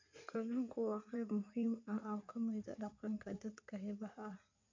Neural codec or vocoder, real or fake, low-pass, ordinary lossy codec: codec, 16 kHz in and 24 kHz out, 2.2 kbps, FireRedTTS-2 codec; fake; 7.2 kHz; MP3, 48 kbps